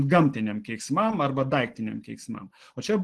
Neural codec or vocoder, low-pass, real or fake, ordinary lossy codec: none; 10.8 kHz; real; Opus, 16 kbps